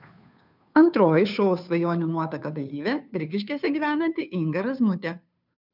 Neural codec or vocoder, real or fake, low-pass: codec, 16 kHz, 2 kbps, FunCodec, trained on Chinese and English, 25 frames a second; fake; 5.4 kHz